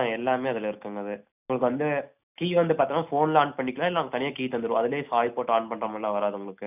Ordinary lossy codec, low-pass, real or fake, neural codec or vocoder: none; 3.6 kHz; real; none